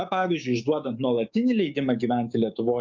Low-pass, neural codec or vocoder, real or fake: 7.2 kHz; codec, 16 kHz, 6 kbps, DAC; fake